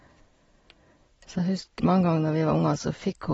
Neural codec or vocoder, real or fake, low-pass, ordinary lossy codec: none; real; 19.8 kHz; AAC, 24 kbps